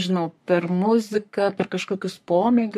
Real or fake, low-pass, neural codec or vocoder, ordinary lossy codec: fake; 14.4 kHz; codec, 44.1 kHz, 3.4 kbps, Pupu-Codec; MP3, 64 kbps